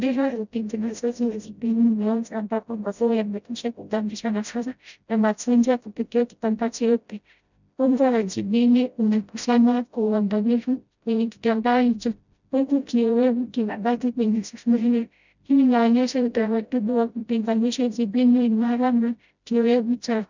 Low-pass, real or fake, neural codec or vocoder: 7.2 kHz; fake; codec, 16 kHz, 0.5 kbps, FreqCodec, smaller model